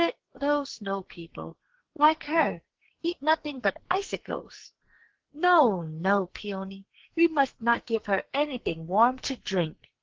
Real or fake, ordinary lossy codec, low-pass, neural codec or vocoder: fake; Opus, 16 kbps; 7.2 kHz; codec, 44.1 kHz, 2.6 kbps, SNAC